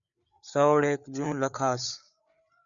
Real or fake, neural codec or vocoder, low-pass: fake; codec, 16 kHz, 4 kbps, FreqCodec, larger model; 7.2 kHz